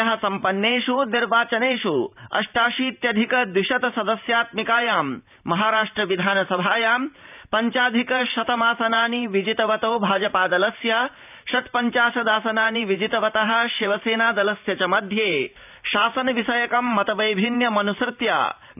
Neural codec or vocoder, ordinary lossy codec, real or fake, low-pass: vocoder, 44.1 kHz, 128 mel bands every 512 samples, BigVGAN v2; none; fake; 3.6 kHz